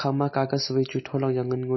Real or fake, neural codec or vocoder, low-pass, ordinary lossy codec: real; none; 7.2 kHz; MP3, 24 kbps